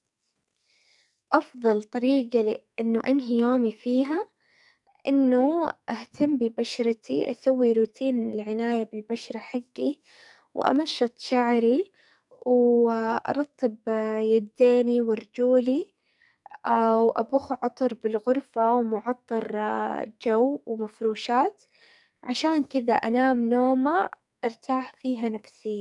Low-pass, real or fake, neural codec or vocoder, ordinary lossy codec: 10.8 kHz; fake; codec, 44.1 kHz, 2.6 kbps, SNAC; none